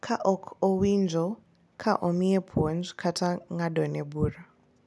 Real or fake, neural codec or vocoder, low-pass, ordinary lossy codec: real; none; none; none